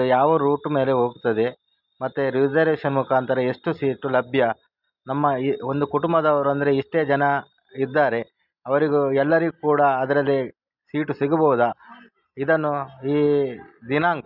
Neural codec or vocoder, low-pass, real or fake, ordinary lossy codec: none; 5.4 kHz; real; none